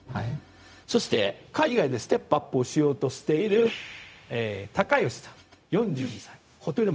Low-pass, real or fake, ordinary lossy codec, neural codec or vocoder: none; fake; none; codec, 16 kHz, 0.4 kbps, LongCat-Audio-Codec